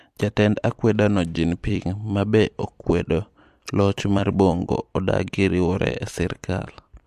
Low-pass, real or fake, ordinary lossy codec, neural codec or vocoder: 14.4 kHz; fake; MP3, 64 kbps; vocoder, 44.1 kHz, 128 mel bands every 256 samples, BigVGAN v2